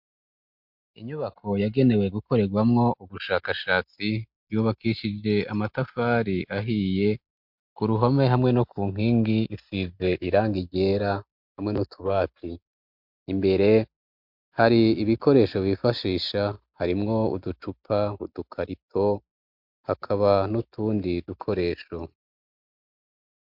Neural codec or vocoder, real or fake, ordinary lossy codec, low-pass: none; real; MP3, 48 kbps; 5.4 kHz